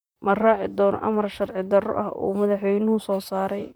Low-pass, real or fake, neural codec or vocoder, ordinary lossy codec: none; fake; vocoder, 44.1 kHz, 128 mel bands, Pupu-Vocoder; none